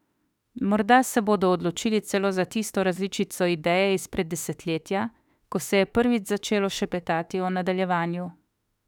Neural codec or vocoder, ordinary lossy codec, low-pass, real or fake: autoencoder, 48 kHz, 32 numbers a frame, DAC-VAE, trained on Japanese speech; none; 19.8 kHz; fake